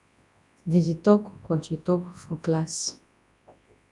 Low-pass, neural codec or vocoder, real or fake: 10.8 kHz; codec, 24 kHz, 0.9 kbps, WavTokenizer, large speech release; fake